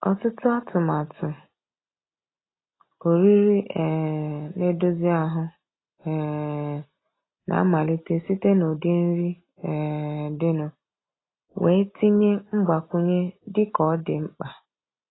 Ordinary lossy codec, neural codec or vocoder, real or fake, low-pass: AAC, 16 kbps; none; real; 7.2 kHz